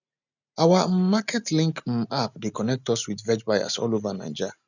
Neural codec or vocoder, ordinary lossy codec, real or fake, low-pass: none; none; real; 7.2 kHz